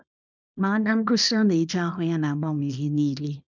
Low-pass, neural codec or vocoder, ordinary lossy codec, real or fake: 7.2 kHz; codec, 24 kHz, 0.9 kbps, WavTokenizer, small release; none; fake